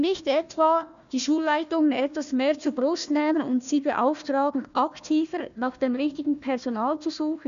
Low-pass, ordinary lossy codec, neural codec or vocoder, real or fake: 7.2 kHz; none; codec, 16 kHz, 1 kbps, FunCodec, trained on Chinese and English, 50 frames a second; fake